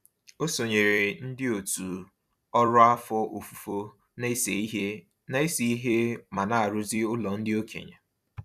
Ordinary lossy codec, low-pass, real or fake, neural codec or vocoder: none; 14.4 kHz; fake; vocoder, 44.1 kHz, 128 mel bands every 256 samples, BigVGAN v2